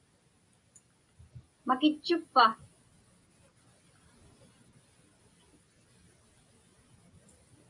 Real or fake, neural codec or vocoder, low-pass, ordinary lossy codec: real; none; 10.8 kHz; AAC, 64 kbps